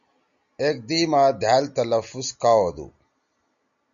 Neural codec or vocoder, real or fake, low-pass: none; real; 7.2 kHz